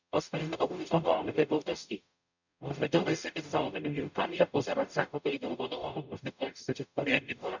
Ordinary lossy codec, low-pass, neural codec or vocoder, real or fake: none; 7.2 kHz; codec, 44.1 kHz, 0.9 kbps, DAC; fake